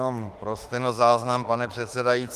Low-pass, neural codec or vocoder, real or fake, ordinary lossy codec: 14.4 kHz; autoencoder, 48 kHz, 32 numbers a frame, DAC-VAE, trained on Japanese speech; fake; Opus, 24 kbps